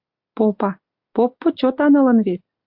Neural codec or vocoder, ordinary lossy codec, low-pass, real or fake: none; AAC, 48 kbps; 5.4 kHz; real